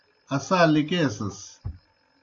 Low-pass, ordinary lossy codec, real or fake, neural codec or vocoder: 7.2 kHz; AAC, 48 kbps; real; none